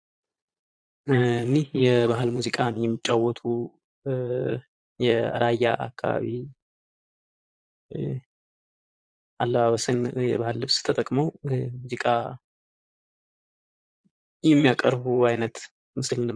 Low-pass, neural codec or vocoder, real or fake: 9.9 kHz; vocoder, 22.05 kHz, 80 mel bands, Vocos; fake